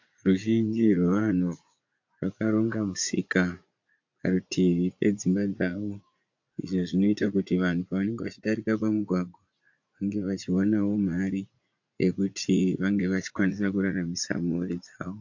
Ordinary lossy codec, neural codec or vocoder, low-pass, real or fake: AAC, 48 kbps; vocoder, 44.1 kHz, 80 mel bands, Vocos; 7.2 kHz; fake